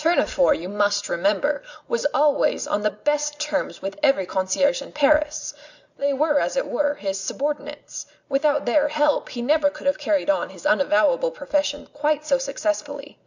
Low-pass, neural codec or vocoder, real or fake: 7.2 kHz; none; real